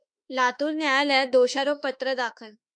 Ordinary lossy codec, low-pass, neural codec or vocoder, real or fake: MP3, 96 kbps; 9.9 kHz; autoencoder, 48 kHz, 32 numbers a frame, DAC-VAE, trained on Japanese speech; fake